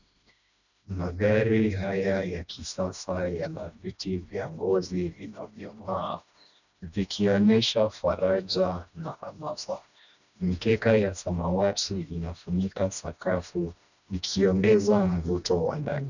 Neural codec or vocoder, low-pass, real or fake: codec, 16 kHz, 1 kbps, FreqCodec, smaller model; 7.2 kHz; fake